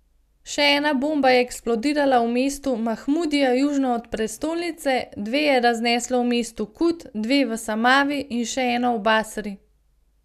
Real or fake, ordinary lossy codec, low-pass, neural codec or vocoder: real; none; 14.4 kHz; none